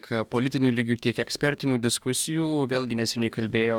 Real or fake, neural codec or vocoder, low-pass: fake; codec, 44.1 kHz, 2.6 kbps, DAC; 19.8 kHz